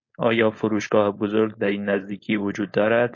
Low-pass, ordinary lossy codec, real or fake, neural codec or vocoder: 7.2 kHz; MP3, 32 kbps; fake; codec, 16 kHz, 4.8 kbps, FACodec